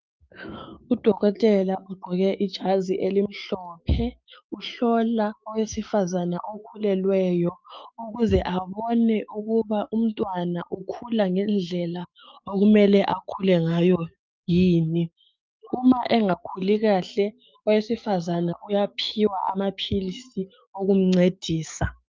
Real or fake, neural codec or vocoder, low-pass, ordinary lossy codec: fake; autoencoder, 48 kHz, 128 numbers a frame, DAC-VAE, trained on Japanese speech; 7.2 kHz; Opus, 24 kbps